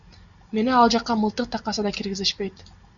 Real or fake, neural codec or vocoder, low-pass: real; none; 7.2 kHz